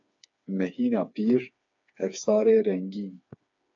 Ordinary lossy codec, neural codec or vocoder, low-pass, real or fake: AAC, 48 kbps; codec, 16 kHz, 4 kbps, FreqCodec, smaller model; 7.2 kHz; fake